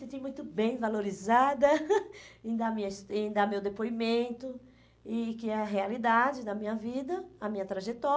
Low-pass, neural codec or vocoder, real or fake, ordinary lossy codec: none; none; real; none